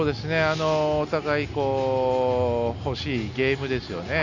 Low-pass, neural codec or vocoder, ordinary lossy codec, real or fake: 7.2 kHz; none; none; real